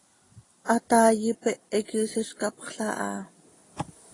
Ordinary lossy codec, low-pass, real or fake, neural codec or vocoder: AAC, 32 kbps; 10.8 kHz; real; none